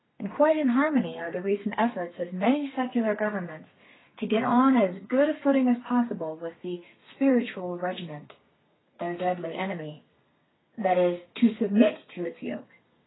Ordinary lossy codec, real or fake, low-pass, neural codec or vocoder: AAC, 16 kbps; fake; 7.2 kHz; codec, 32 kHz, 1.9 kbps, SNAC